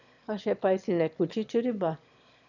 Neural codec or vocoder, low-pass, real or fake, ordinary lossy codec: autoencoder, 22.05 kHz, a latent of 192 numbers a frame, VITS, trained on one speaker; 7.2 kHz; fake; none